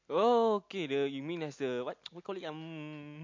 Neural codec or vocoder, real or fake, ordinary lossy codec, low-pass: none; real; MP3, 48 kbps; 7.2 kHz